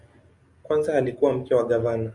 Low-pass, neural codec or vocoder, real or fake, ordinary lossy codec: 10.8 kHz; none; real; MP3, 96 kbps